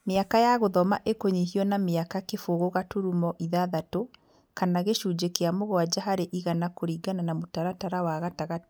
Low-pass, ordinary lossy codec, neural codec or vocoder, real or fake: none; none; none; real